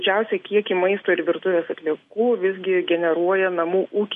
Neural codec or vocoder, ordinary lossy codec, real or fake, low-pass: none; MP3, 48 kbps; real; 14.4 kHz